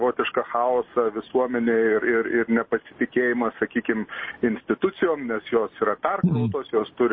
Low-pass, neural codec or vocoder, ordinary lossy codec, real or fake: 7.2 kHz; none; MP3, 24 kbps; real